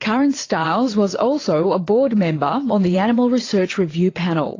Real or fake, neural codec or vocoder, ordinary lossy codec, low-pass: fake; vocoder, 22.05 kHz, 80 mel bands, WaveNeXt; AAC, 32 kbps; 7.2 kHz